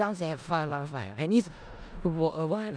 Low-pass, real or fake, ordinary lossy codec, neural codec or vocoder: 9.9 kHz; fake; none; codec, 16 kHz in and 24 kHz out, 0.4 kbps, LongCat-Audio-Codec, four codebook decoder